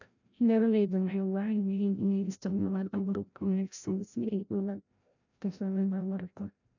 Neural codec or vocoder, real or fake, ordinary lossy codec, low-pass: codec, 16 kHz, 0.5 kbps, FreqCodec, larger model; fake; none; 7.2 kHz